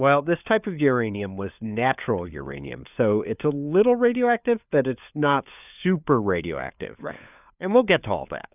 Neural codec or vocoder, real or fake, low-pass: codec, 16 kHz, 4 kbps, FunCodec, trained on LibriTTS, 50 frames a second; fake; 3.6 kHz